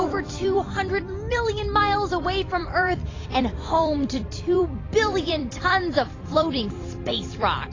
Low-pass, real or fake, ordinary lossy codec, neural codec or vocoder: 7.2 kHz; fake; AAC, 32 kbps; vocoder, 44.1 kHz, 128 mel bands every 256 samples, BigVGAN v2